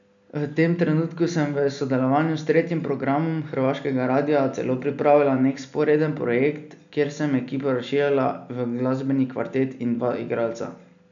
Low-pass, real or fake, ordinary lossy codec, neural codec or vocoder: 7.2 kHz; real; none; none